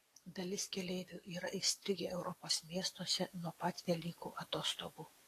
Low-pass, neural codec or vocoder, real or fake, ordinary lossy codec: 14.4 kHz; codec, 44.1 kHz, 7.8 kbps, DAC; fake; AAC, 48 kbps